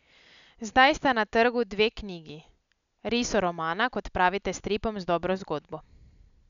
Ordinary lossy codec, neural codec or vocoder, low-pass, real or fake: none; none; 7.2 kHz; real